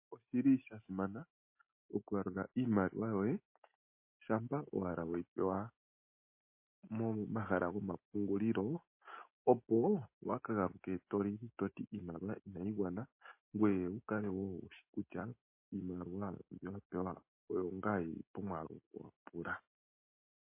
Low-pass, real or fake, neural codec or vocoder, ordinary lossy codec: 3.6 kHz; real; none; MP3, 24 kbps